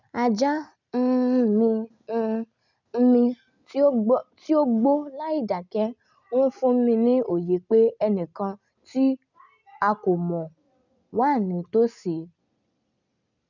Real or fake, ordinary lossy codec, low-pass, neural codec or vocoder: real; none; 7.2 kHz; none